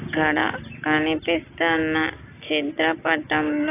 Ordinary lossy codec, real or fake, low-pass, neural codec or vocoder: AAC, 24 kbps; real; 3.6 kHz; none